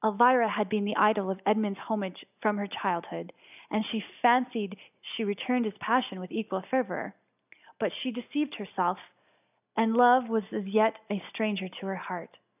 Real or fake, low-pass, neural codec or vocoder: real; 3.6 kHz; none